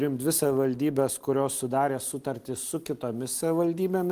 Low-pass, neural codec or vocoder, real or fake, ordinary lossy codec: 14.4 kHz; none; real; Opus, 24 kbps